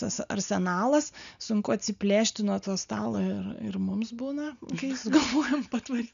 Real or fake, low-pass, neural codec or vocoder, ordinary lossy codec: real; 7.2 kHz; none; MP3, 96 kbps